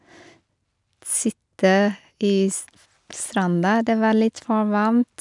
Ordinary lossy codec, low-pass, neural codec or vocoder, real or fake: none; 10.8 kHz; none; real